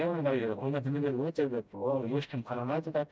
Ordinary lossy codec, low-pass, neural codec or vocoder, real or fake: none; none; codec, 16 kHz, 0.5 kbps, FreqCodec, smaller model; fake